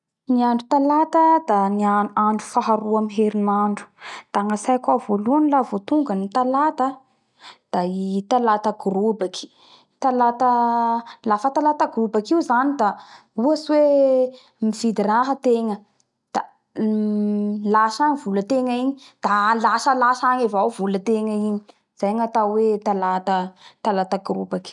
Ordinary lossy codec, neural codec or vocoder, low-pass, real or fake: none; none; 10.8 kHz; real